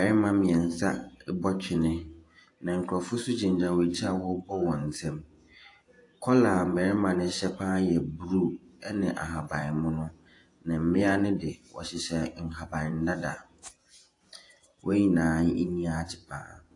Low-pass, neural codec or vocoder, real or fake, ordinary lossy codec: 10.8 kHz; vocoder, 48 kHz, 128 mel bands, Vocos; fake; AAC, 48 kbps